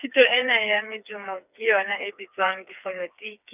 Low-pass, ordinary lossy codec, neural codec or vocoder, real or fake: 3.6 kHz; none; codec, 16 kHz, 4 kbps, FreqCodec, smaller model; fake